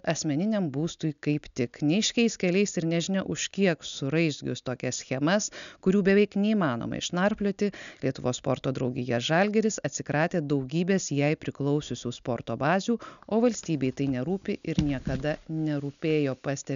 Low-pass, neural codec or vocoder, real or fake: 7.2 kHz; none; real